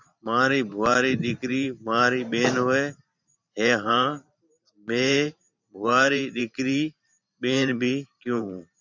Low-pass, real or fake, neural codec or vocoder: 7.2 kHz; fake; vocoder, 44.1 kHz, 128 mel bands every 512 samples, BigVGAN v2